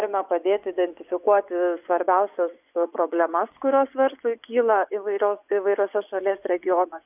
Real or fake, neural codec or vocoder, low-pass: fake; vocoder, 24 kHz, 100 mel bands, Vocos; 3.6 kHz